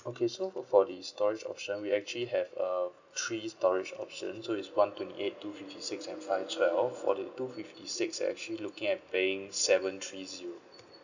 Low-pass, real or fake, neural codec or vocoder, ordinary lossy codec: 7.2 kHz; real; none; none